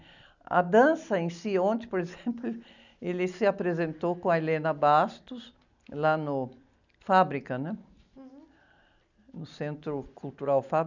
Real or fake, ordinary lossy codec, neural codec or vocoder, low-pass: real; none; none; 7.2 kHz